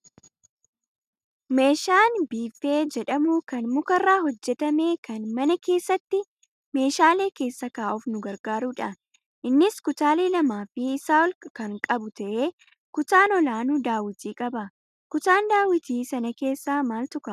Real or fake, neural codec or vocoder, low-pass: real; none; 14.4 kHz